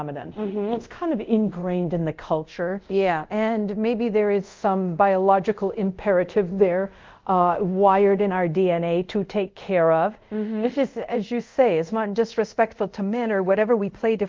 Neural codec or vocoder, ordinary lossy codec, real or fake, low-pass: codec, 24 kHz, 0.5 kbps, DualCodec; Opus, 24 kbps; fake; 7.2 kHz